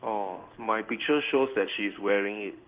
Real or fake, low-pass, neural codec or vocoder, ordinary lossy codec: fake; 3.6 kHz; codec, 16 kHz in and 24 kHz out, 1 kbps, XY-Tokenizer; Opus, 32 kbps